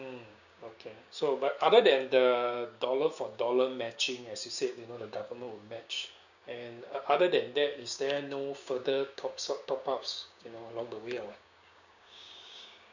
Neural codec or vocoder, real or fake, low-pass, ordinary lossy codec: codec, 16 kHz, 6 kbps, DAC; fake; 7.2 kHz; none